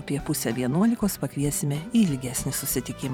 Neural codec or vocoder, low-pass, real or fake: vocoder, 44.1 kHz, 128 mel bands every 512 samples, BigVGAN v2; 19.8 kHz; fake